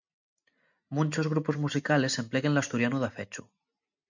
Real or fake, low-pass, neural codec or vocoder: real; 7.2 kHz; none